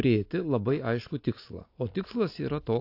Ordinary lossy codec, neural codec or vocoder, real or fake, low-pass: AAC, 32 kbps; none; real; 5.4 kHz